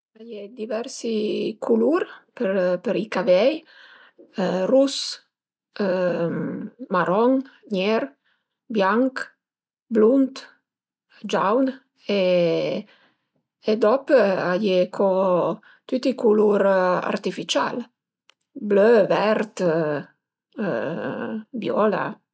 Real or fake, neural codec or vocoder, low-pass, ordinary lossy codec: real; none; none; none